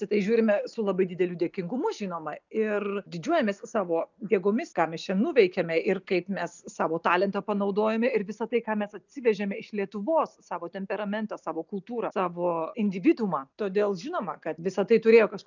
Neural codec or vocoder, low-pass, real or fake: none; 7.2 kHz; real